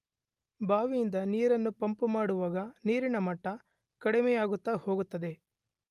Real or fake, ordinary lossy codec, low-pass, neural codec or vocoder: real; Opus, 32 kbps; 14.4 kHz; none